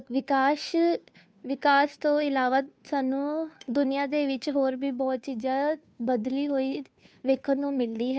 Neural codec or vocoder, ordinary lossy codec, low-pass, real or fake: codec, 16 kHz, 2 kbps, FunCodec, trained on Chinese and English, 25 frames a second; none; none; fake